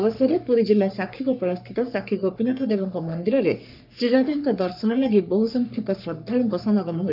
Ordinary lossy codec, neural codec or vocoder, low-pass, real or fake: none; codec, 44.1 kHz, 3.4 kbps, Pupu-Codec; 5.4 kHz; fake